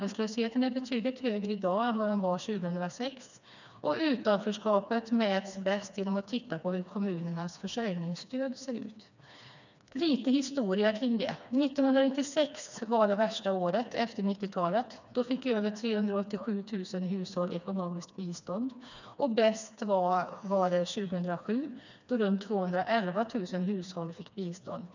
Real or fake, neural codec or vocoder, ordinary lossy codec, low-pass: fake; codec, 16 kHz, 2 kbps, FreqCodec, smaller model; none; 7.2 kHz